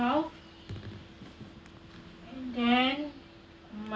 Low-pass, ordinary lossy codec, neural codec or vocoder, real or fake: none; none; none; real